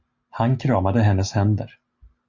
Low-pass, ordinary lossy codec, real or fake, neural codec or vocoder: 7.2 kHz; Opus, 64 kbps; real; none